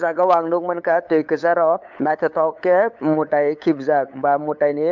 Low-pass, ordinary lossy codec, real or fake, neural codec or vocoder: 7.2 kHz; MP3, 64 kbps; fake; codec, 16 kHz, 8 kbps, FunCodec, trained on LibriTTS, 25 frames a second